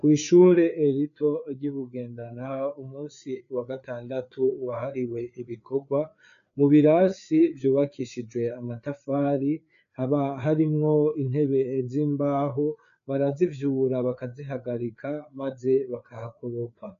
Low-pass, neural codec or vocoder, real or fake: 7.2 kHz; codec, 16 kHz, 4 kbps, FreqCodec, larger model; fake